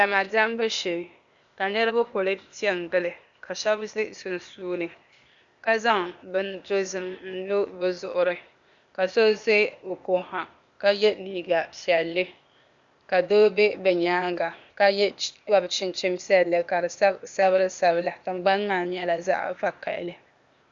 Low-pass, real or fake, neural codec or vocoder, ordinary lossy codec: 7.2 kHz; fake; codec, 16 kHz, 0.8 kbps, ZipCodec; Opus, 64 kbps